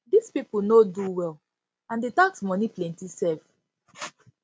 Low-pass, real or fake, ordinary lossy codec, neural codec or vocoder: none; real; none; none